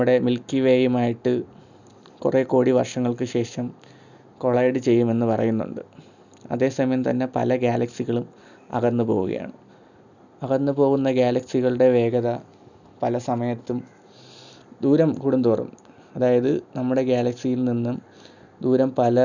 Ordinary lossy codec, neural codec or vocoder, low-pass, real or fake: none; none; 7.2 kHz; real